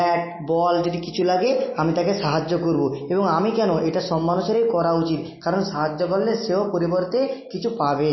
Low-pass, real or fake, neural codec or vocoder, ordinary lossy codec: 7.2 kHz; real; none; MP3, 24 kbps